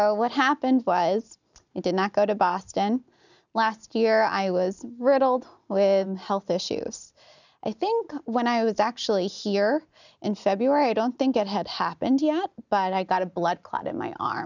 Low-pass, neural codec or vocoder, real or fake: 7.2 kHz; none; real